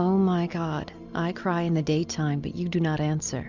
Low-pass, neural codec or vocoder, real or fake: 7.2 kHz; none; real